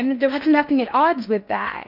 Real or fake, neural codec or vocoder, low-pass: fake; codec, 16 kHz in and 24 kHz out, 0.8 kbps, FocalCodec, streaming, 65536 codes; 5.4 kHz